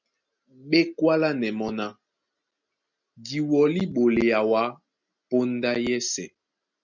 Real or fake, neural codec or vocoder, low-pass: real; none; 7.2 kHz